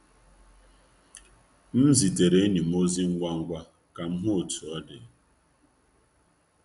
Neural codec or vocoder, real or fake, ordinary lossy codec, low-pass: none; real; MP3, 96 kbps; 10.8 kHz